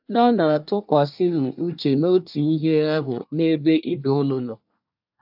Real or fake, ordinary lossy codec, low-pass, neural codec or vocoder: fake; AAC, 48 kbps; 5.4 kHz; codec, 24 kHz, 1 kbps, SNAC